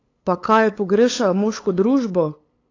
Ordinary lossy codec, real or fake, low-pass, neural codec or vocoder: AAC, 32 kbps; fake; 7.2 kHz; codec, 16 kHz, 8 kbps, FunCodec, trained on LibriTTS, 25 frames a second